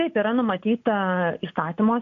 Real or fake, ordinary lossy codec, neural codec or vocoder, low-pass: real; AAC, 64 kbps; none; 7.2 kHz